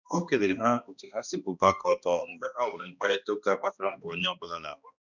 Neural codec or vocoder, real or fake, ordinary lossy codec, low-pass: codec, 16 kHz, 1 kbps, X-Codec, HuBERT features, trained on balanced general audio; fake; none; 7.2 kHz